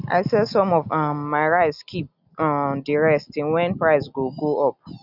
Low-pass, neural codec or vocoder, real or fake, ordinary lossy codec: 5.4 kHz; none; real; none